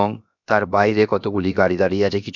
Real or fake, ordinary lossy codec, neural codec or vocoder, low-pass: fake; none; codec, 16 kHz, 0.7 kbps, FocalCodec; 7.2 kHz